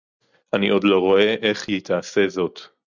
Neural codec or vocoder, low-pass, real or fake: none; 7.2 kHz; real